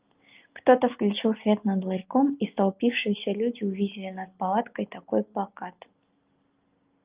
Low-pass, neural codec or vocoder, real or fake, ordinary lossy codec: 3.6 kHz; none; real; Opus, 24 kbps